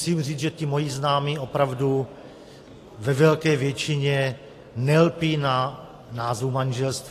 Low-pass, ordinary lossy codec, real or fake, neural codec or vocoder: 14.4 kHz; AAC, 48 kbps; real; none